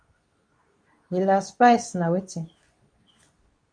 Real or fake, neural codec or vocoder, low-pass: fake; codec, 24 kHz, 0.9 kbps, WavTokenizer, medium speech release version 2; 9.9 kHz